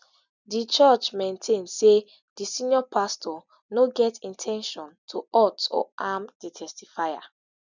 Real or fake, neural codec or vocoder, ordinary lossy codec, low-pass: real; none; none; 7.2 kHz